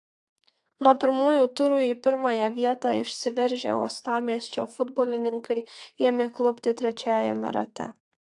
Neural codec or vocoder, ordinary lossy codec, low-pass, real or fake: codec, 32 kHz, 1.9 kbps, SNAC; AAC, 64 kbps; 10.8 kHz; fake